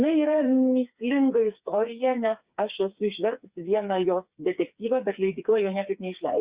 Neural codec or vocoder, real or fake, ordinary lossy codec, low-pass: codec, 16 kHz, 4 kbps, FreqCodec, smaller model; fake; Opus, 64 kbps; 3.6 kHz